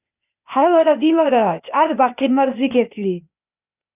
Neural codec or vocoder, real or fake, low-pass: codec, 16 kHz, 0.8 kbps, ZipCodec; fake; 3.6 kHz